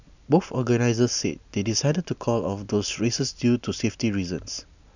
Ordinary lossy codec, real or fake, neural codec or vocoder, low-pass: none; real; none; 7.2 kHz